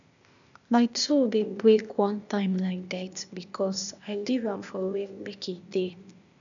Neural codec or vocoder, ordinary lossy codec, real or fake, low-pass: codec, 16 kHz, 0.8 kbps, ZipCodec; none; fake; 7.2 kHz